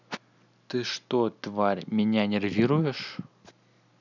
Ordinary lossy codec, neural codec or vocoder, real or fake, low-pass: none; none; real; 7.2 kHz